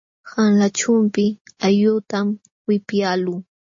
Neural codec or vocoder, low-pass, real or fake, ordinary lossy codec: none; 7.2 kHz; real; MP3, 32 kbps